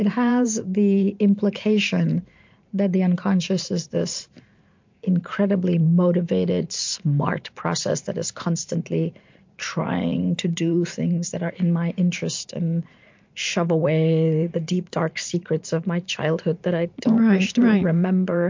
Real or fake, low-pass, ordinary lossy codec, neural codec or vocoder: fake; 7.2 kHz; MP3, 48 kbps; vocoder, 44.1 kHz, 128 mel bands every 512 samples, BigVGAN v2